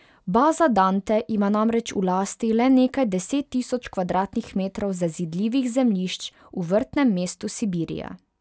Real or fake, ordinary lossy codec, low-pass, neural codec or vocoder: real; none; none; none